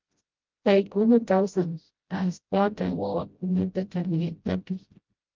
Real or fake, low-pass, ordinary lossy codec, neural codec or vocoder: fake; 7.2 kHz; Opus, 24 kbps; codec, 16 kHz, 0.5 kbps, FreqCodec, smaller model